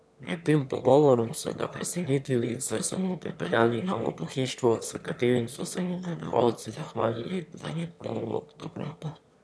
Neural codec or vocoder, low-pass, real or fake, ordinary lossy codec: autoencoder, 22.05 kHz, a latent of 192 numbers a frame, VITS, trained on one speaker; none; fake; none